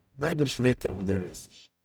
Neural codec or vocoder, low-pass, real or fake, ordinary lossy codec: codec, 44.1 kHz, 0.9 kbps, DAC; none; fake; none